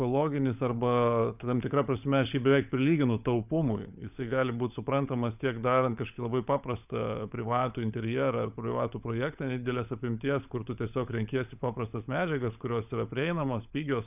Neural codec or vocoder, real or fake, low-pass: codec, 16 kHz, 16 kbps, FunCodec, trained on LibriTTS, 50 frames a second; fake; 3.6 kHz